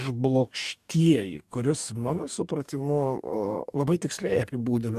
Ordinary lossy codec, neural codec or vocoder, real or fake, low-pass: MP3, 96 kbps; codec, 44.1 kHz, 2.6 kbps, DAC; fake; 14.4 kHz